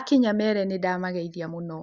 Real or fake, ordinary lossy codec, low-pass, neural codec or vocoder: real; Opus, 64 kbps; 7.2 kHz; none